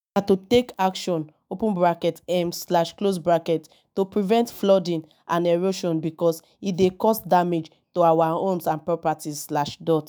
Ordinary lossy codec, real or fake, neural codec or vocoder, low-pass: none; fake; autoencoder, 48 kHz, 128 numbers a frame, DAC-VAE, trained on Japanese speech; none